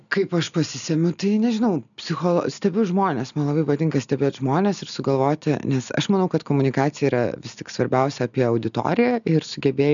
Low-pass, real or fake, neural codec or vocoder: 7.2 kHz; real; none